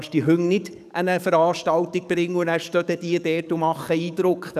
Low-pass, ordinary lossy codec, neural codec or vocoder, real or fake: 14.4 kHz; none; codec, 44.1 kHz, 7.8 kbps, DAC; fake